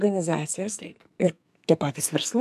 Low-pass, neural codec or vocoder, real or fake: 14.4 kHz; codec, 44.1 kHz, 2.6 kbps, SNAC; fake